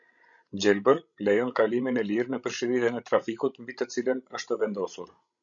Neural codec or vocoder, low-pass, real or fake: codec, 16 kHz, 16 kbps, FreqCodec, larger model; 7.2 kHz; fake